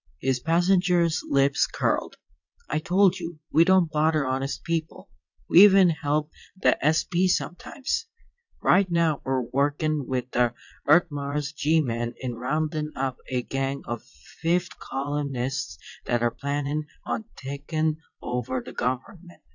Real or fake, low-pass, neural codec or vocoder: fake; 7.2 kHz; vocoder, 22.05 kHz, 80 mel bands, Vocos